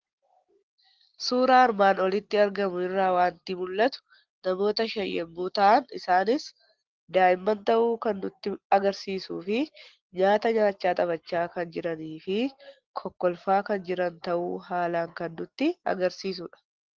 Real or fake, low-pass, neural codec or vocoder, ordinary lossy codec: real; 7.2 kHz; none; Opus, 16 kbps